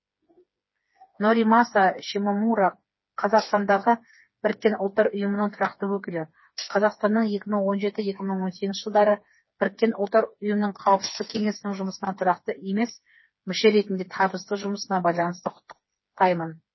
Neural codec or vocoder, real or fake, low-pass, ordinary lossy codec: codec, 16 kHz, 4 kbps, FreqCodec, smaller model; fake; 7.2 kHz; MP3, 24 kbps